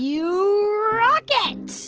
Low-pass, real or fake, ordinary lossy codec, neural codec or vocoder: 7.2 kHz; real; Opus, 16 kbps; none